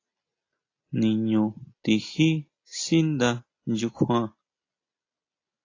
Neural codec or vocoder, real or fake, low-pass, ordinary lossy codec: none; real; 7.2 kHz; AAC, 32 kbps